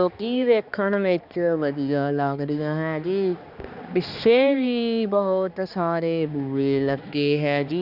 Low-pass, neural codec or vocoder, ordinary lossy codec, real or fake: 5.4 kHz; codec, 16 kHz, 2 kbps, X-Codec, HuBERT features, trained on balanced general audio; Opus, 64 kbps; fake